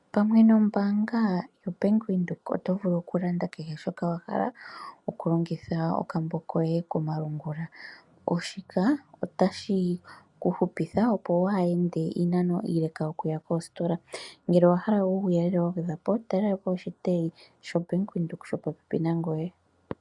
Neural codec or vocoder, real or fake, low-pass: none; real; 10.8 kHz